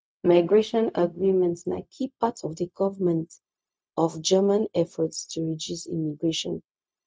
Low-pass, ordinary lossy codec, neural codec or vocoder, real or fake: none; none; codec, 16 kHz, 0.4 kbps, LongCat-Audio-Codec; fake